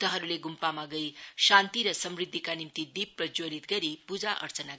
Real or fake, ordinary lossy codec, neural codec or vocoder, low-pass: real; none; none; none